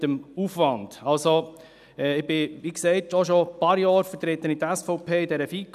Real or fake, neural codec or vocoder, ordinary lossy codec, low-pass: real; none; none; 14.4 kHz